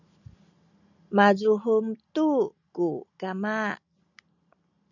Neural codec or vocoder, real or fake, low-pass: none; real; 7.2 kHz